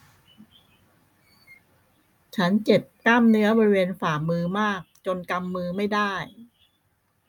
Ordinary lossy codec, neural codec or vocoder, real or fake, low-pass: none; none; real; 19.8 kHz